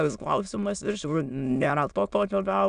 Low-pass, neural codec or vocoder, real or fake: 9.9 kHz; autoencoder, 22.05 kHz, a latent of 192 numbers a frame, VITS, trained on many speakers; fake